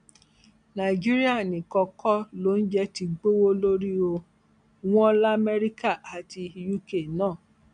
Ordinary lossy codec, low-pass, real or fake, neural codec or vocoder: MP3, 96 kbps; 9.9 kHz; real; none